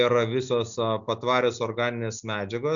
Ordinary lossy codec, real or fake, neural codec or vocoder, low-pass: MP3, 96 kbps; real; none; 7.2 kHz